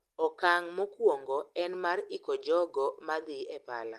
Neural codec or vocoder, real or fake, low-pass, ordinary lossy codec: none; real; 14.4 kHz; Opus, 24 kbps